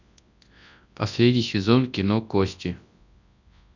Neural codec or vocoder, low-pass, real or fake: codec, 24 kHz, 0.9 kbps, WavTokenizer, large speech release; 7.2 kHz; fake